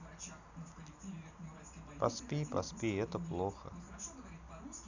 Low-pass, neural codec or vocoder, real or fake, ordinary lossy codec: 7.2 kHz; none; real; none